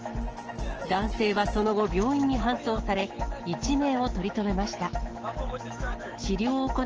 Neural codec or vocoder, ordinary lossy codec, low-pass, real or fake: autoencoder, 48 kHz, 128 numbers a frame, DAC-VAE, trained on Japanese speech; Opus, 16 kbps; 7.2 kHz; fake